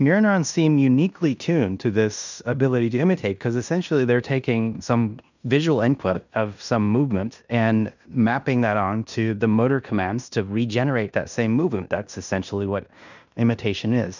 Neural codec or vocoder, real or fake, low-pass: codec, 16 kHz in and 24 kHz out, 0.9 kbps, LongCat-Audio-Codec, fine tuned four codebook decoder; fake; 7.2 kHz